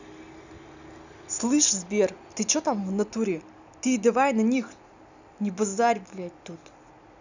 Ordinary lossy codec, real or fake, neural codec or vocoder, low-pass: none; real; none; 7.2 kHz